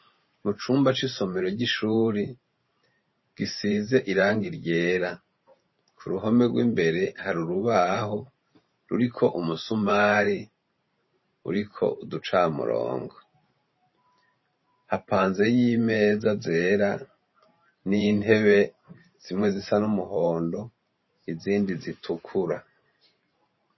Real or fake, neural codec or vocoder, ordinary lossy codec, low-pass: fake; vocoder, 44.1 kHz, 128 mel bands every 512 samples, BigVGAN v2; MP3, 24 kbps; 7.2 kHz